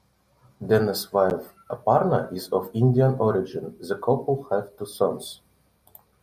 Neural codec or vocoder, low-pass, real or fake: none; 14.4 kHz; real